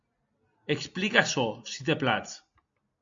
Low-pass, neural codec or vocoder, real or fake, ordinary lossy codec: 7.2 kHz; none; real; AAC, 64 kbps